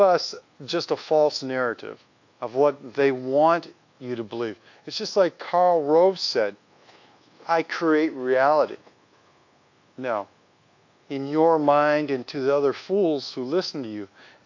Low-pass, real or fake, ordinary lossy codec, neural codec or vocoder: 7.2 kHz; fake; AAC, 48 kbps; codec, 24 kHz, 1.2 kbps, DualCodec